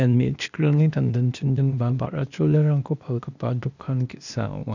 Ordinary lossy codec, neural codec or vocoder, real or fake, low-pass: none; codec, 16 kHz, 0.8 kbps, ZipCodec; fake; 7.2 kHz